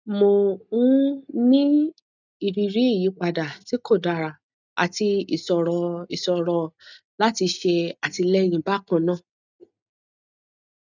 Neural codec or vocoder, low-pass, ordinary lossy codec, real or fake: none; 7.2 kHz; none; real